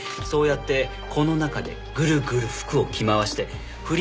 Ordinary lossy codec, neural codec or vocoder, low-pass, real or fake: none; none; none; real